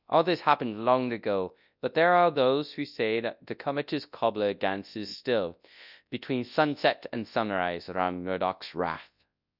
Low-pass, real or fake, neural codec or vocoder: 5.4 kHz; fake; codec, 24 kHz, 0.9 kbps, WavTokenizer, large speech release